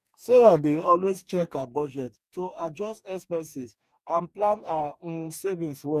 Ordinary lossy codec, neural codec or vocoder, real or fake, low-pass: none; codec, 44.1 kHz, 2.6 kbps, DAC; fake; 14.4 kHz